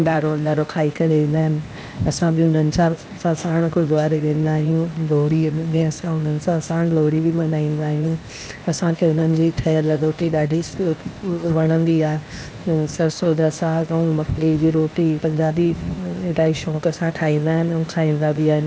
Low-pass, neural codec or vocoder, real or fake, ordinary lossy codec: none; codec, 16 kHz, 0.8 kbps, ZipCodec; fake; none